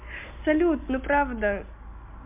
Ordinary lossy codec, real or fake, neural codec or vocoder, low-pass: MP3, 32 kbps; real; none; 3.6 kHz